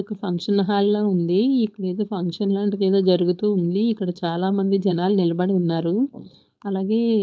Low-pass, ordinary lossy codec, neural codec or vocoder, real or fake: none; none; codec, 16 kHz, 4.8 kbps, FACodec; fake